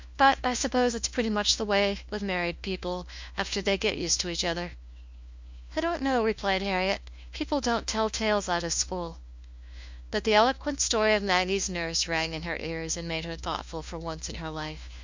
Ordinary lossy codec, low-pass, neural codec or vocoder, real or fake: MP3, 64 kbps; 7.2 kHz; codec, 16 kHz, 1 kbps, FunCodec, trained on LibriTTS, 50 frames a second; fake